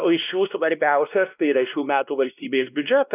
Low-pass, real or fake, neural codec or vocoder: 3.6 kHz; fake; codec, 16 kHz, 1 kbps, X-Codec, WavLM features, trained on Multilingual LibriSpeech